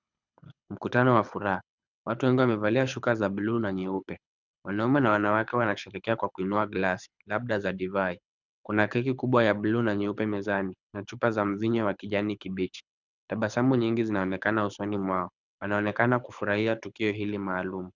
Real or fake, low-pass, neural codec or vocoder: fake; 7.2 kHz; codec, 24 kHz, 6 kbps, HILCodec